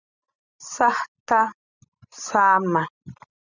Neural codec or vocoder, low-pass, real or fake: none; 7.2 kHz; real